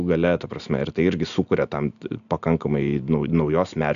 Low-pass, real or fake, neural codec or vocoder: 7.2 kHz; real; none